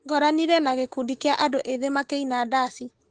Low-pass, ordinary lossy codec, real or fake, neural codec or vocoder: 9.9 kHz; Opus, 16 kbps; fake; autoencoder, 48 kHz, 128 numbers a frame, DAC-VAE, trained on Japanese speech